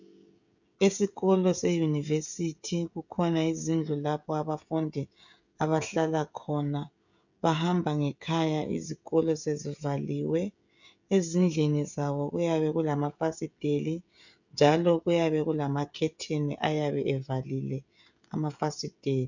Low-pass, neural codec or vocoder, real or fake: 7.2 kHz; codec, 16 kHz, 16 kbps, FreqCodec, smaller model; fake